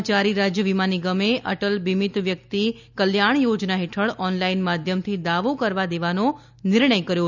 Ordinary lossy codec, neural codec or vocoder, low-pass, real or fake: none; none; 7.2 kHz; real